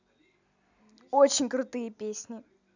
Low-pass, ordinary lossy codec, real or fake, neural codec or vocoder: 7.2 kHz; none; real; none